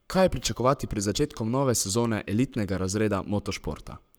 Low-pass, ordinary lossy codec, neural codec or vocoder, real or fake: none; none; codec, 44.1 kHz, 7.8 kbps, Pupu-Codec; fake